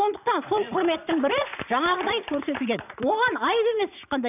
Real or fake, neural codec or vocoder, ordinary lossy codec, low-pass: fake; vocoder, 44.1 kHz, 80 mel bands, Vocos; none; 3.6 kHz